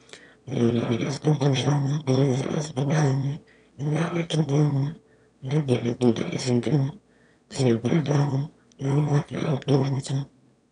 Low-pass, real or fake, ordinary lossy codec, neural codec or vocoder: 9.9 kHz; fake; MP3, 96 kbps; autoencoder, 22.05 kHz, a latent of 192 numbers a frame, VITS, trained on one speaker